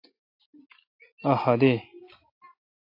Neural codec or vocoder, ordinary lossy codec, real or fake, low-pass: none; AAC, 32 kbps; real; 5.4 kHz